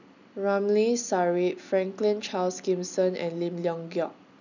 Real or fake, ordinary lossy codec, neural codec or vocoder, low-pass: real; none; none; 7.2 kHz